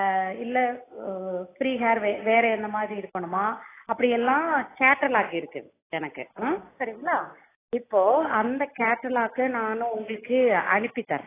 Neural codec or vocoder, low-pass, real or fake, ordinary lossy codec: none; 3.6 kHz; real; AAC, 16 kbps